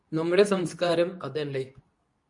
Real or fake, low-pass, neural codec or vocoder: fake; 10.8 kHz; codec, 24 kHz, 0.9 kbps, WavTokenizer, medium speech release version 2